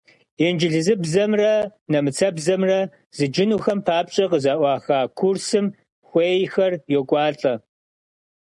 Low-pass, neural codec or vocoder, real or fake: 10.8 kHz; none; real